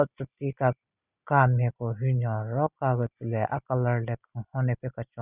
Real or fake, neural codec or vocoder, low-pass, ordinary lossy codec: real; none; 3.6 kHz; Opus, 64 kbps